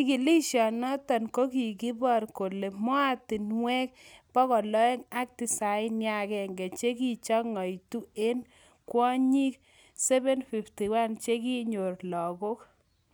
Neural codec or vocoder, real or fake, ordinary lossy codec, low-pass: none; real; none; none